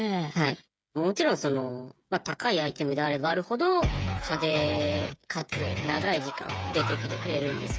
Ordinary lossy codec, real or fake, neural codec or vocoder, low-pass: none; fake; codec, 16 kHz, 8 kbps, FreqCodec, smaller model; none